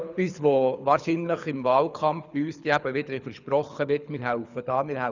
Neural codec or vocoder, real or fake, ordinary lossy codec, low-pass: codec, 24 kHz, 6 kbps, HILCodec; fake; none; 7.2 kHz